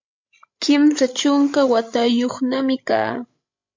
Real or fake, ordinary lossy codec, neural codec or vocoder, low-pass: fake; MP3, 48 kbps; codec, 16 kHz, 16 kbps, FreqCodec, larger model; 7.2 kHz